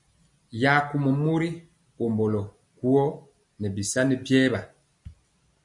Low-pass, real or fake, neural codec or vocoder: 10.8 kHz; real; none